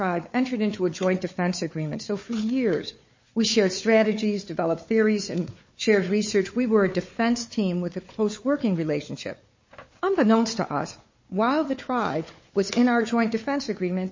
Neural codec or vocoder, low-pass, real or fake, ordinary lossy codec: codec, 16 kHz, 4 kbps, FunCodec, trained on Chinese and English, 50 frames a second; 7.2 kHz; fake; MP3, 32 kbps